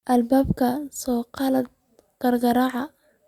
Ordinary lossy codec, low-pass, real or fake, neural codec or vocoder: Opus, 64 kbps; 19.8 kHz; real; none